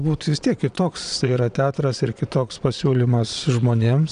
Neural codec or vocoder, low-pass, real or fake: vocoder, 22.05 kHz, 80 mel bands, Vocos; 9.9 kHz; fake